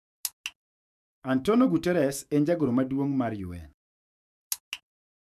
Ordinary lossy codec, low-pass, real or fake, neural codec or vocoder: none; 14.4 kHz; fake; autoencoder, 48 kHz, 128 numbers a frame, DAC-VAE, trained on Japanese speech